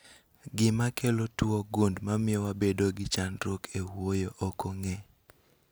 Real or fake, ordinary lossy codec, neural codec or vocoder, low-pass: real; none; none; none